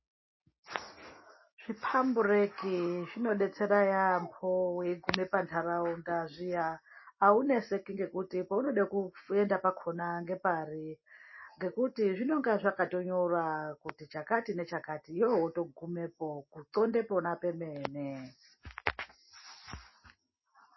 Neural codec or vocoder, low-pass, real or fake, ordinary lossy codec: none; 7.2 kHz; real; MP3, 24 kbps